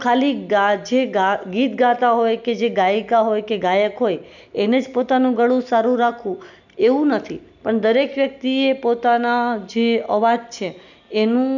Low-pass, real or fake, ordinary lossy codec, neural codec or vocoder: 7.2 kHz; real; none; none